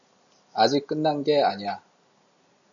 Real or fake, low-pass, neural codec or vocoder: real; 7.2 kHz; none